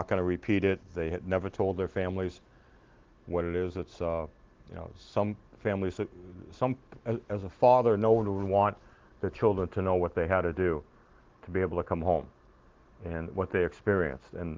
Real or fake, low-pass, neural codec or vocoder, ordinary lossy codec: real; 7.2 kHz; none; Opus, 16 kbps